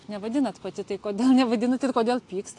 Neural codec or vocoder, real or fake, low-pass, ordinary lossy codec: none; real; 10.8 kHz; AAC, 48 kbps